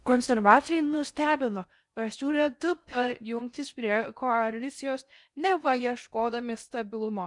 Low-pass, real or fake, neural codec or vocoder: 10.8 kHz; fake; codec, 16 kHz in and 24 kHz out, 0.6 kbps, FocalCodec, streaming, 4096 codes